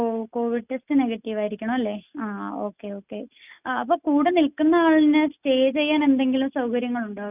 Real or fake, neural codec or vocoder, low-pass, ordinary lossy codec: real; none; 3.6 kHz; none